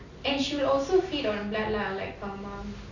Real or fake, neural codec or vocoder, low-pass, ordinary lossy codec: real; none; 7.2 kHz; none